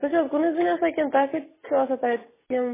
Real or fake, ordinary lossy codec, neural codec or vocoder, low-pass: real; MP3, 16 kbps; none; 3.6 kHz